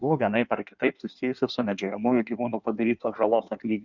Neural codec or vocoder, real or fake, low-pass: codec, 16 kHz in and 24 kHz out, 1.1 kbps, FireRedTTS-2 codec; fake; 7.2 kHz